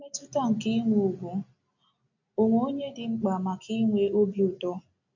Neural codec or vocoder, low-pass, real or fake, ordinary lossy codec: none; 7.2 kHz; real; none